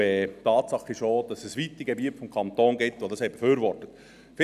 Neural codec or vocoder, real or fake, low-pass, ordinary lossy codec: none; real; 14.4 kHz; none